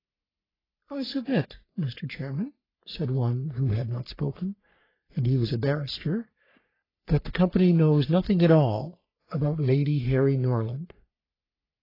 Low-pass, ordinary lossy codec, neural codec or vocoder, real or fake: 5.4 kHz; AAC, 24 kbps; codec, 44.1 kHz, 3.4 kbps, Pupu-Codec; fake